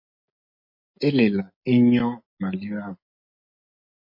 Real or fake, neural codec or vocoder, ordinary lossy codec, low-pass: real; none; MP3, 32 kbps; 5.4 kHz